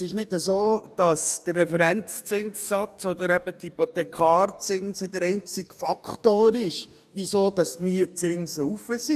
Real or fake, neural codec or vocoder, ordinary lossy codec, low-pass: fake; codec, 44.1 kHz, 2.6 kbps, DAC; none; 14.4 kHz